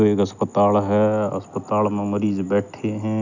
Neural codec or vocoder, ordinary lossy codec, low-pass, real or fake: none; none; 7.2 kHz; real